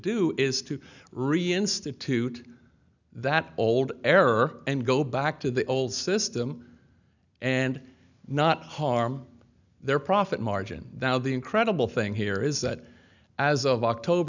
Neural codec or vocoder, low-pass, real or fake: none; 7.2 kHz; real